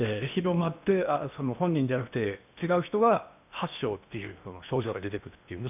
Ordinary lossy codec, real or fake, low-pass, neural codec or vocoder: none; fake; 3.6 kHz; codec, 16 kHz in and 24 kHz out, 0.8 kbps, FocalCodec, streaming, 65536 codes